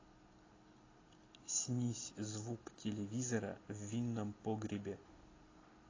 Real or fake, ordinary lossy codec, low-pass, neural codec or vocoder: real; AAC, 32 kbps; 7.2 kHz; none